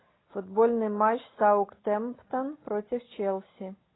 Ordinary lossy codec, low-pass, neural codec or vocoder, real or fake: AAC, 16 kbps; 7.2 kHz; none; real